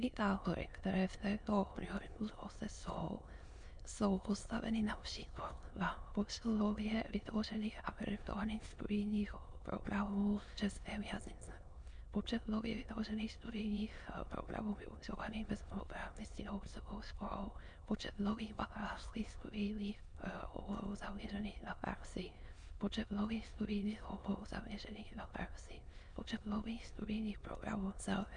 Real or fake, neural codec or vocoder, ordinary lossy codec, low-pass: fake; autoencoder, 22.05 kHz, a latent of 192 numbers a frame, VITS, trained on many speakers; MP3, 64 kbps; 9.9 kHz